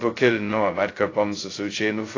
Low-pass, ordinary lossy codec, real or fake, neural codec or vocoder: 7.2 kHz; AAC, 32 kbps; fake; codec, 16 kHz, 0.2 kbps, FocalCodec